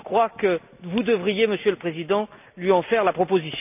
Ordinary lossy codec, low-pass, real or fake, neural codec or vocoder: none; 3.6 kHz; real; none